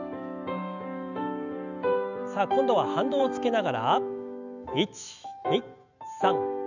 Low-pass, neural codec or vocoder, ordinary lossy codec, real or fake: 7.2 kHz; autoencoder, 48 kHz, 128 numbers a frame, DAC-VAE, trained on Japanese speech; none; fake